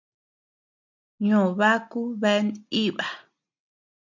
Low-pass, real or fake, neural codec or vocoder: 7.2 kHz; real; none